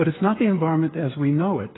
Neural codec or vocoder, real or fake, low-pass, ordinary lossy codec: codec, 16 kHz, 8 kbps, FreqCodec, smaller model; fake; 7.2 kHz; AAC, 16 kbps